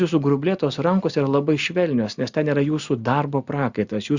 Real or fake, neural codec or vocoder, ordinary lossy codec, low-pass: real; none; Opus, 64 kbps; 7.2 kHz